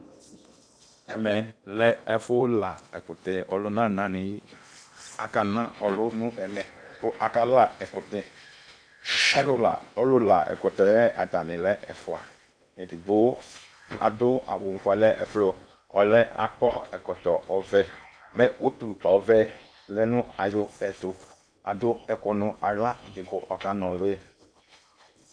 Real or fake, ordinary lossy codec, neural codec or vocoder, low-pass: fake; AAC, 64 kbps; codec, 16 kHz in and 24 kHz out, 0.8 kbps, FocalCodec, streaming, 65536 codes; 9.9 kHz